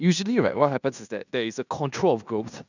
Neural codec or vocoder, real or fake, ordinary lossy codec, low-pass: codec, 16 kHz in and 24 kHz out, 0.9 kbps, LongCat-Audio-Codec, fine tuned four codebook decoder; fake; none; 7.2 kHz